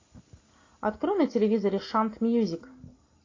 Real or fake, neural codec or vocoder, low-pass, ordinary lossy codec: real; none; 7.2 kHz; AAC, 32 kbps